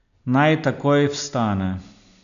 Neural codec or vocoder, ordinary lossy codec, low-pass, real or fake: none; none; 7.2 kHz; real